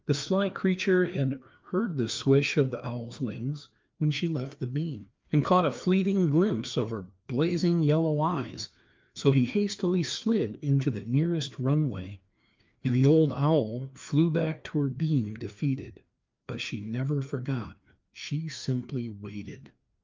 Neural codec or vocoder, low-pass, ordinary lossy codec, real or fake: codec, 16 kHz, 2 kbps, FreqCodec, larger model; 7.2 kHz; Opus, 24 kbps; fake